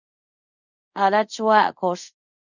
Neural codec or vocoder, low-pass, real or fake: codec, 24 kHz, 0.5 kbps, DualCodec; 7.2 kHz; fake